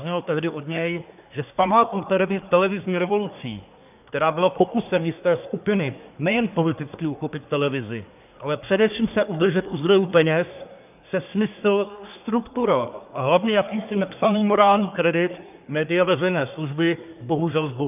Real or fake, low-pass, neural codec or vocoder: fake; 3.6 kHz; codec, 24 kHz, 1 kbps, SNAC